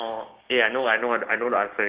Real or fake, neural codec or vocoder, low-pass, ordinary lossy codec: fake; codec, 16 kHz, 6 kbps, DAC; 3.6 kHz; Opus, 16 kbps